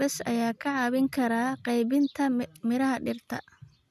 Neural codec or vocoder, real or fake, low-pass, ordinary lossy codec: none; real; 14.4 kHz; none